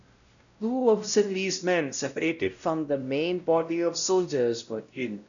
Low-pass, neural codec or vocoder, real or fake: 7.2 kHz; codec, 16 kHz, 0.5 kbps, X-Codec, WavLM features, trained on Multilingual LibriSpeech; fake